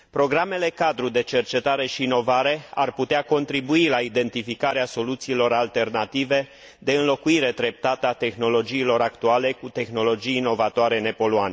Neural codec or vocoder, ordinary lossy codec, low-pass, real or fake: none; none; none; real